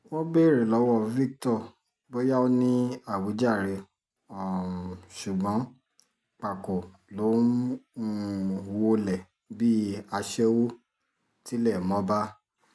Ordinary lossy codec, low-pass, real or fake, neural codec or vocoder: none; none; real; none